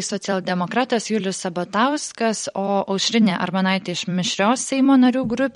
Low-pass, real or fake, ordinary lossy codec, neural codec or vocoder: 19.8 kHz; fake; MP3, 48 kbps; vocoder, 44.1 kHz, 128 mel bands every 256 samples, BigVGAN v2